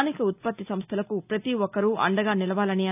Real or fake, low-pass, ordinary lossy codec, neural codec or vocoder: real; 3.6 kHz; none; none